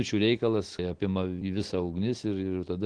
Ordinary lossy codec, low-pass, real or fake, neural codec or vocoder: Opus, 16 kbps; 9.9 kHz; real; none